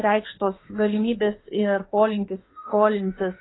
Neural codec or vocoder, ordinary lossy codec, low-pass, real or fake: autoencoder, 48 kHz, 32 numbers a frame, DAC-VAE, trained on Japanese speech; AAC, 16 kbps; 7.2 kHz; fake